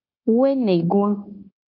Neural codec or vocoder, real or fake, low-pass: codec, 16 kHz, 0.9 kbps, LongCat-Audio-Codec; fake; 5.4 kHz